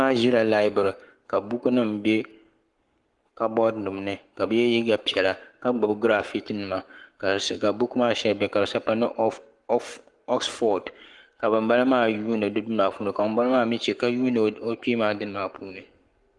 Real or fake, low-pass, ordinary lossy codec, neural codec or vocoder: fake; 10.8 kHz; Opus, 24 kbps; codec, 44.1 kHz, 7.8 kbps, Pupu-Codec